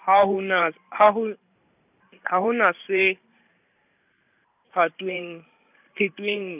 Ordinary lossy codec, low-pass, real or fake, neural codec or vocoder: none; 3.6 kHz; fake; vocoder, 44.1 kHz, 128 mel bands every 512 samples, BigVGAN v2